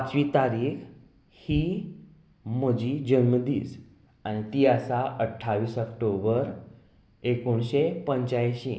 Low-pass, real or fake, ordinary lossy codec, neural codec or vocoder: none; real; none; none